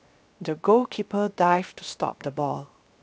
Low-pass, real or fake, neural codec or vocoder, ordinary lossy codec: none; fake; codec, 16 kHz, 0.7 kbps, FocalCodec; none